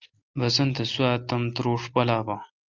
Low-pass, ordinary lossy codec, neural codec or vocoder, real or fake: 7.2 kHz; Opus, 32 kbps; none; real